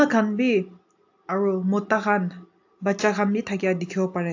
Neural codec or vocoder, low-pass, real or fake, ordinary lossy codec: none; 7.2 kHz; real; AAC, 48 kbps